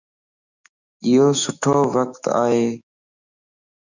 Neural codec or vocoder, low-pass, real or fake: autoencoder, 48 kHz, 128 numbers a frame, DAC-VAE, trained on Japanese speech; 7.2 kHz; fake